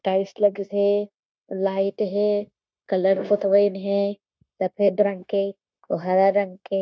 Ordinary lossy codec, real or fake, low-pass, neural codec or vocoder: none; fake; none; codec, 16 kHz, 0.9 kbps, LongCat-Audio-Codec